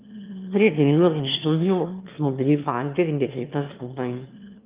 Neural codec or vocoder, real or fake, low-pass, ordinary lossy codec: autoencoder, 22.05 kHz, a latent of 192 numbers a frame, VITS, trained on one speaker; fake; 3.6 kHz; Opus, 24 kbps